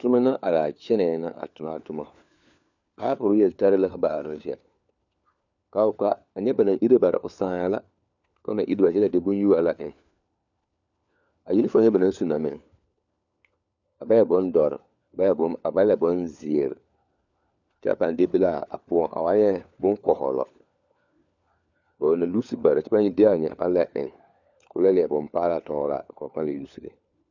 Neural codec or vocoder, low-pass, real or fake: codec, 16 kHz, 4 kbps, FunCodec, trained on LibriTTS, 50 frames a second; 7.2 kHz; fake